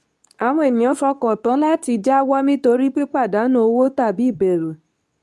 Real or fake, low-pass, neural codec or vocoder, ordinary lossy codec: fake; none; codec, 24 kHz, 0.9 kbps, WavTokenizer, medium speech release version 2; none